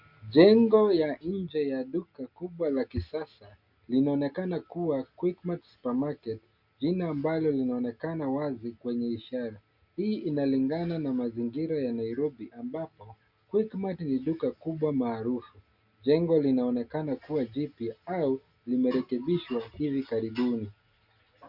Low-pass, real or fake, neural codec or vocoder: 5.4 kHz; real; none